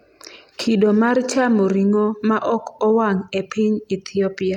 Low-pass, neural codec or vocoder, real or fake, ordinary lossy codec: 19.8 kHz; none; real; none